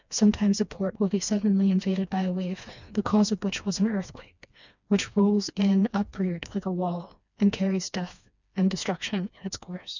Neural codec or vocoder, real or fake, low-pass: codec, 16 kHz, 2 kbps, FreqCodec, smaller model; fake; 7.2 kHz